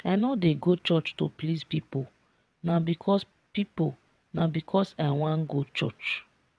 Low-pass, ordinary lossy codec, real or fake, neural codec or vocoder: none; none; fake; vocoder, 22.05 kHz, 80 mel bands, WaveNeXt